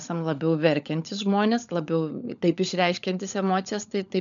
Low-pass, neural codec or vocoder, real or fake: 7.2 kHz; codec, 16 kHz, 16 kbps, FunCodec, trained on LibriTTS, 50 frames a second; fake